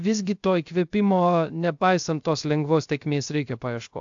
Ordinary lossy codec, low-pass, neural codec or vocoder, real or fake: AAC, 64 kbps; 7.2 kHz; codec, 16 kHz, 0.3 kbps, FocalCodec; fake